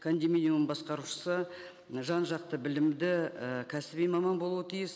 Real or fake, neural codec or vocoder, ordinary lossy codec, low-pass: real; none; none; none